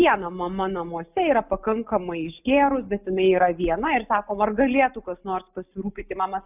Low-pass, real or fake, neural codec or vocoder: 3.6 kHz; real; none